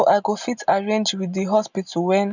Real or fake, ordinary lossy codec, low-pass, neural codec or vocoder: real; none; 7.2 kHz; none